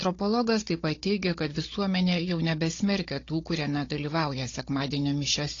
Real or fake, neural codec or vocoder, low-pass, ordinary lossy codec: fake; codec, 16 kHz, 16 kbps, FunCodec, trained on Chinese and English, 50 frames a second; 7.2 kHz; AAC, 32 kbps